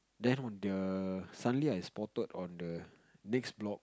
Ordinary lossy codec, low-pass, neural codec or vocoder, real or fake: none; none; none; real